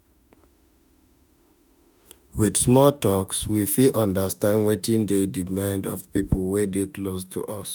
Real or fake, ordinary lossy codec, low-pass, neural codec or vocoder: fake; none; none; autoencoder, 48 kHz, 32 numbers a frame, DAC-VAE, trained on Japanese speech